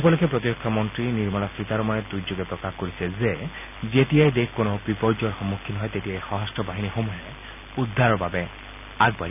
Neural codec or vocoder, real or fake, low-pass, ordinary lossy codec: none; real; 3.6 kHz; none